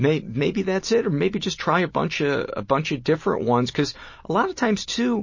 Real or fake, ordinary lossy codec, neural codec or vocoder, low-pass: real; MP3, 32 kbps; none; 7.2 kHz